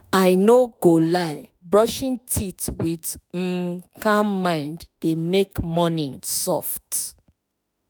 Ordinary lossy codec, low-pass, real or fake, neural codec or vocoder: none; none; fake; autoencoder, 48 kHz, 32 numbers a frame, DAC-VAE, trained on Japanese speech